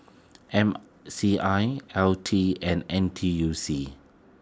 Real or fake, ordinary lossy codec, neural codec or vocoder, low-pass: real; none; none; none